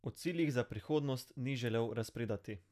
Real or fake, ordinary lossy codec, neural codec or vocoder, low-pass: real; none; none; 14.4 kHz